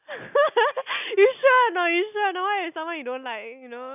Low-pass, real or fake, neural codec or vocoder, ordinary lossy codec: 3.6 kHz; real; none; none